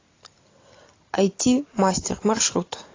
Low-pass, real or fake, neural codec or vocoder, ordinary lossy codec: 7.2 kHz; real; none; AAC, 32 kbps